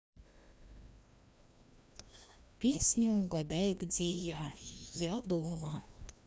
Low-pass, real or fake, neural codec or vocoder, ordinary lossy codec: none; fake; codec, 16 kHz, 1 kbps, FreqCodec, larger model; none